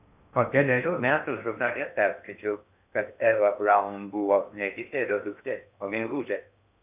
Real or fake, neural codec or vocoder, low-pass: fake; codec, 16 kHz in and 24 kHz out, 0.8 kbps, FocalCodec, streaming, 65536 codes; 3.6 kHz